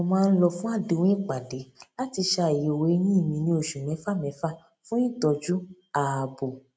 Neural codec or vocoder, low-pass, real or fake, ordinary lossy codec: none; none; real; none